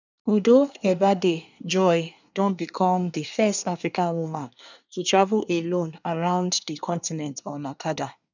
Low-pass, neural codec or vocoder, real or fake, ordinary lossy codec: 7.2 kHz; codec, 24 kHz, 1 kbps, SNAC; fake; none